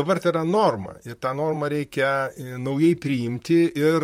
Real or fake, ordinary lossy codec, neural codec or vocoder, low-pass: fake; MP3, 64 kbps; vocoder, 44.1 kHz, 128 mel bands, Pupu-Vocoder; 19.8 kHz